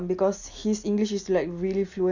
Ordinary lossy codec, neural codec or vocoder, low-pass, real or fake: none; none; 7.2 kHz; real